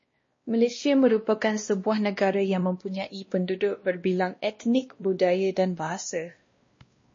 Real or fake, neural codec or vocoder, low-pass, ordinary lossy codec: fake; codec, 16 kHz, 1 kbps, X-Codec, WavLM features, trained on Multilingual LibriSpeech; 7.2 kHz; MP3, 32 kbps